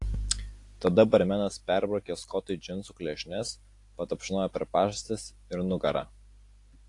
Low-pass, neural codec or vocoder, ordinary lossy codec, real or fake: 10.8 kHz; none; AAC, 48 kbps; real